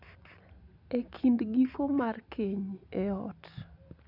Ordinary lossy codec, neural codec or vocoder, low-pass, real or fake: none; none; 5.4 kHz; real